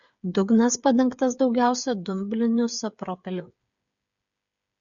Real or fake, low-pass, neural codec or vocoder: fake; 7.2 kHz; codec, 16 kHz, 8 kbps, FreqCodec, smaller model